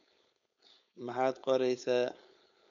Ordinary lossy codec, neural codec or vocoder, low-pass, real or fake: none; codec, 16 kHz, 4.8 kbps, FACodec; 7.2 kHz; fake